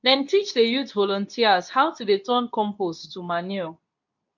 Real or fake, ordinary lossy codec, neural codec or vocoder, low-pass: fake; none; codec, 24 kHz, 0.9 kbps, WavTokenizer, medium speech release version 2; 7.2 kHz